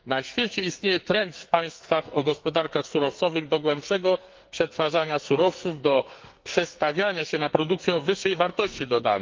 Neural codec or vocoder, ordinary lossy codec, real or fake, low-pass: codec, 44.1 kHz, 2.6 kbps, SNAC; Opus, 24 kbps; fake; 7.2 kHz